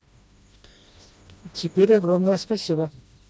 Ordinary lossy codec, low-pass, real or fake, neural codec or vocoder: none; none; fake; codec, 16 kHz, 1 kbps, FreqCodec, smaller model